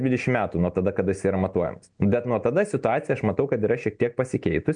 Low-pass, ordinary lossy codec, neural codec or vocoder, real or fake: 9.9 kHz; MP3, 64 kbps; none; real